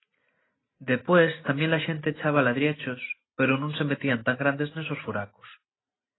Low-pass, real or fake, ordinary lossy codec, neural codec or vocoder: 7.2 kHz; real; AAC, 16 kbps; none